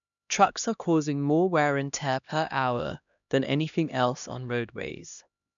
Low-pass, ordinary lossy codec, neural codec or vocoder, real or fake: 7.2 kHz; none; codec, 16 kHz, 1 kbps, X-Codec, HuBERT features, trained on LibriSpeech; fake